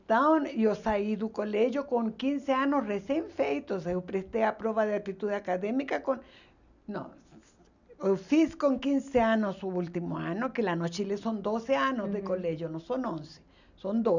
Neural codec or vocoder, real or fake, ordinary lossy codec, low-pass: none; real; none; 7.2 kHz